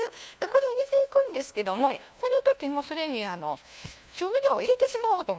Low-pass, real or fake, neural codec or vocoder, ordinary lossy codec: none; fake; codec, 16 kHz, 1 kbps, FunCodec, trained on LibriTTS, 50 frames a second; none